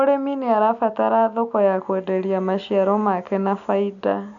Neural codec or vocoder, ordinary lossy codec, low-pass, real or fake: none; none; 7.2 kHz; real